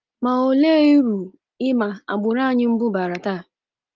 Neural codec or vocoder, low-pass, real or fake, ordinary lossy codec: none; 7.2 kHz; real; Opus, 32 kbps